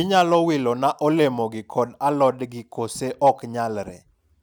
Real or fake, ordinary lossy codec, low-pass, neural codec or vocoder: real; none; none; none